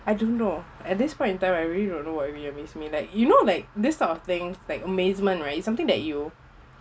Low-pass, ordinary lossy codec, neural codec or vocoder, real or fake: none; none; none; real